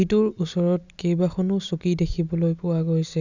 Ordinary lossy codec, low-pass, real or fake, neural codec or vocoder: none; 7.2 kHz; real; none